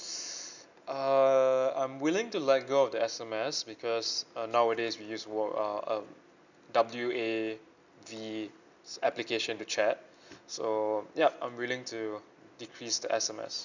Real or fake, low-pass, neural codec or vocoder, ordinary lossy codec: real; 7.2 kHz; none; none